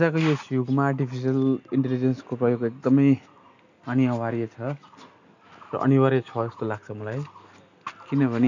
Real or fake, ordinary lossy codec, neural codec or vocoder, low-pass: real; none; none; 7.2 kHz